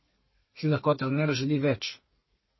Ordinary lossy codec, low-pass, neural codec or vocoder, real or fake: MP3, 24 kbps; 7.2 kHz; codec, 32 kHz, 1.9 kbps, SNAC; fake